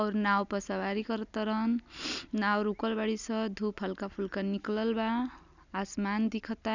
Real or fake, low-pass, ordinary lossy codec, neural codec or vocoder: real; 7.2 kHz; none; none